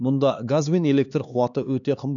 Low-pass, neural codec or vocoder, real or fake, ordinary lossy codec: 7.2 kHz; codec, 16 kHz, 2 kbps, X-Codec, HuBERT features, trained on LibriSpeech; fake; none